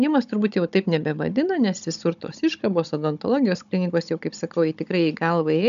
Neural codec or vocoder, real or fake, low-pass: codec, 16 kHz, 16 kbps, FunCodec, trained on Chinese and English, 50 frames a second; fake; 7.2 kHz